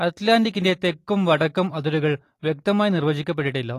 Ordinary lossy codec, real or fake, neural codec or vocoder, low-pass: AAC, 48 kbps; real; none; 14.4 kHz